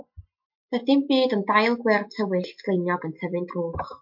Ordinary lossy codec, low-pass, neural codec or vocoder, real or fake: MP3, 48 kbps; 5.4 kHz; none; real